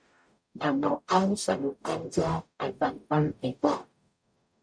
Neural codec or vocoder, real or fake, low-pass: codec, 44.1 kHz, 0.9 kbps, DAC; fake; 9.9 kHz